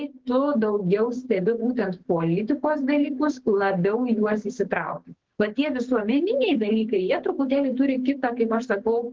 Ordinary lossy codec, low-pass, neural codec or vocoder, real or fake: Opus, 16 kbps; 7.2 kHz; codec, 16 kHz, 6 kbps, DAC; fake